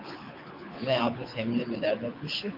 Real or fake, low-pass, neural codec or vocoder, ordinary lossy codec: fake; 5.4 kHz; codec, 24 kHz, 6 kbps, HILCodec; MP3, 48 kbps